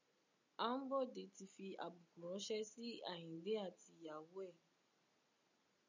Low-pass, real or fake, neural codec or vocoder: 7.2 kHz; real; none